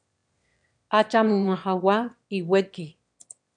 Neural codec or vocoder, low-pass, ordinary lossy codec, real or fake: autoencoder, 22.05 kHz, a latent of 192 numbers a frame, VITS, trained on one speaker; 9.9 kHz; MP3, 96 kbps; fake